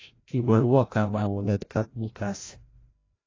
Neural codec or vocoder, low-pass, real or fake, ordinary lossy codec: codec, 16 kHz, 0.5 kbps, FreqCodec, larger model; 7.2 kHz; fake; AAC, 32 kbps